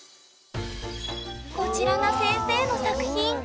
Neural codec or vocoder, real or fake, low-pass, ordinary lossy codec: none; real; none; none